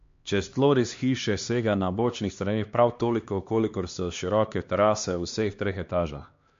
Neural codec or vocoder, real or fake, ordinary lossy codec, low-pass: codec, 16 kHz, 2 kbps, X-Codec, HuBERT features, trained on LibriSpeech; fake; MP3, 48 kbps; 7.2 kHz